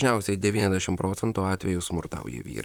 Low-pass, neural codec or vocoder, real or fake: 19.8 kHz; vocoder, 44.1 kHz, 128 mel bands, Pupu-Vocoder; fake